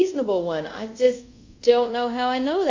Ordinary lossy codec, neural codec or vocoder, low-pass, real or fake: MP3, 64 kbps; codec, 24 kHz, 0.5 kbps, DualCodec; 7.2 kHz; fake